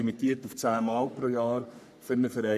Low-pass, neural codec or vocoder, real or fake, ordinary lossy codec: 14.4 kHz; codec, 44.1 kHz, 3.4 kbps, Pupu-Codec; fake; none